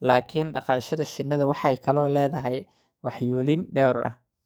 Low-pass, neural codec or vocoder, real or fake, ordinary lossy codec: none; codec, 44.1 kHz, 2.6 kbps, SNAC; fake; none